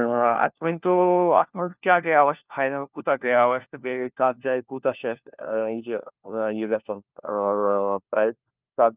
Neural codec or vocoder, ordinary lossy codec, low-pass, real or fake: codec, 16 kHz, 1 kbps, FunCodec, trained on LibriTTS, 50 frames a second; Opus, 24 kbps; 3.6 kHz; fake